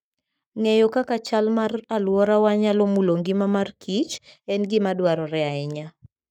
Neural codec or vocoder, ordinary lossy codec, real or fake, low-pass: codec, 44.1 kHz, 7.8 kbps, Pupu-Codec; none; fake; 19.8 kHz